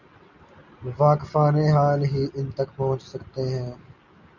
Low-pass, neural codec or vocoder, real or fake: 7.2 kHz; none; real